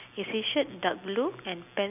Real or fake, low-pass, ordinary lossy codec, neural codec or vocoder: real; 3.6 kHz; none; none